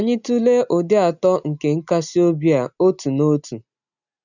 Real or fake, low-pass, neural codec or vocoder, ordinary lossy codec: real; 7.2 kHz; none; none